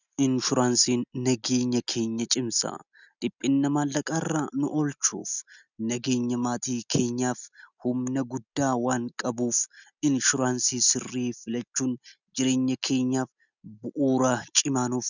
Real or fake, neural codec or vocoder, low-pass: real; none; 7.2 kHz